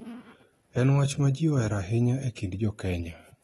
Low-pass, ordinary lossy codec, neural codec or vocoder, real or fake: 19.8 kHz; AAC, 32 kbps; none; real